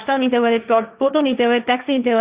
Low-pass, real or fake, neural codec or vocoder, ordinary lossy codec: 3.6 kHz; fake; codec, 16 kHz, 1.1 kbps, Voila-Tokenizer; Opus, 64 kbps